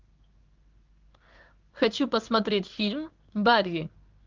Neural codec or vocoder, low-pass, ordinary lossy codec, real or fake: codec, 16 kHz in and 24 kHz out, 1 kbps, XY-Tokenizer; 7.2 kHz; Opus, 24 kbps; fake